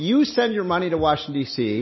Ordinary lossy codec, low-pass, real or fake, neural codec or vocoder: MP3, 24 kbps; 7.2 kHz; real; none